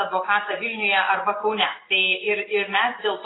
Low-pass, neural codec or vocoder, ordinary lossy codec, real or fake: 7.2 kHz; none; AAC, 16 kbps; real